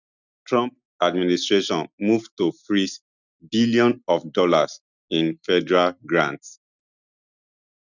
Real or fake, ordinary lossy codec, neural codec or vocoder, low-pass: real; none; none; 7.2 kHz